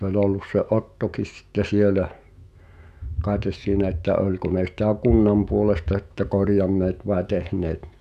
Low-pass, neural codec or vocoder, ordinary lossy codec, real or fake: 14.4 kHz; autoencoder, 48 kHz, 128 numbers a frame, DAC-VAE, trained on Japanese speech; none; fake